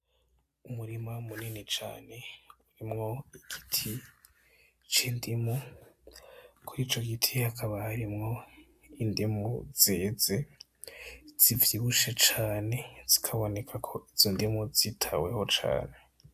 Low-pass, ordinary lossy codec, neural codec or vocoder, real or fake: 14.4 kHz; AAC, 96 kbps; none; real